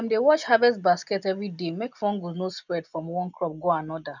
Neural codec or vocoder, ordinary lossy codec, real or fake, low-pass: none; none; real; 7.2 kHz